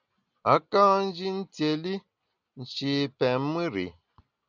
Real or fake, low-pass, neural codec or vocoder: real; 7.2 kHz; none